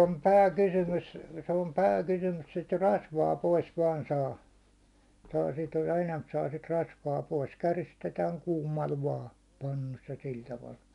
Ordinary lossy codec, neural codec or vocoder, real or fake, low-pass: none; none; real; 10.8 kHz